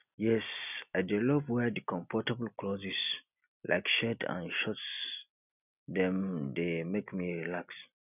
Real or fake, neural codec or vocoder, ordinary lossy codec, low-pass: real; none; none; 3.6 kHz